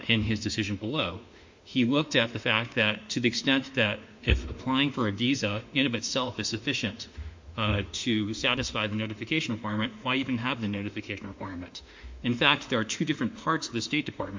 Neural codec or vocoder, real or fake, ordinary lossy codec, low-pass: autoencoder, 48 kHz, 32 numbers a frame, DAC-VAE, trained on Japanese speech; fake; MP3, 48 kbps; 7.2 kHz